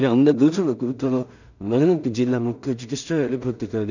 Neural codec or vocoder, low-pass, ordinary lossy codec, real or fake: codec, 16 kHz in and 24 kHz out, 0.4 kbps, LongCat-Audio-Codec, two codebook decoder; 7.2 kHz; none; fake